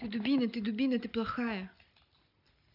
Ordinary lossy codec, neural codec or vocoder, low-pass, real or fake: none; none; 5.4 kHz; real